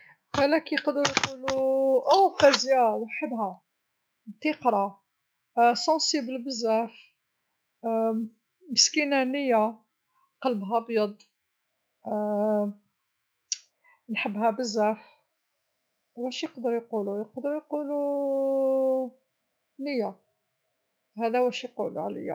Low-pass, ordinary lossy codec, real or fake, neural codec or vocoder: none; none; fake; autoencoder, 48 kHz, 128 numbers a frame, DAC-VAE, trained on Japanese speech